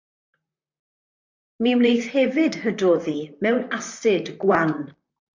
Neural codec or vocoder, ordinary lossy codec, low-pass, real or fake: vocoder, 44.1 kHz, 128 mel bands, Pupu-Vocoder; MP3, 48 kbps; 7.2 kHz; fake